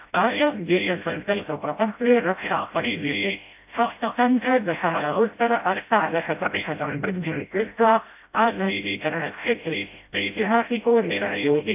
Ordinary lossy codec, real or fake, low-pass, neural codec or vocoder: AAC, 32 kbps; fake; 3.6 kHz; codec, 16 kHz, 0.5 kbps, FreqCodec, smaller model